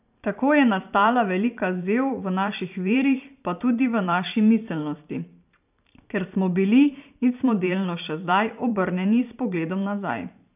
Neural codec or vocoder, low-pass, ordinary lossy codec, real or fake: vocoder, 44.1 kHz, 128 mel bands every 512 samples, BigVGAN v2; 3.6 kHz; none; fake